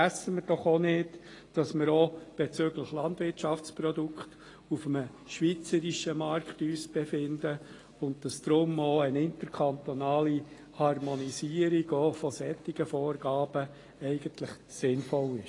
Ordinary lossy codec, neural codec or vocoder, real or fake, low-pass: AAC, 32 kbps; none; real; 10.8 kHz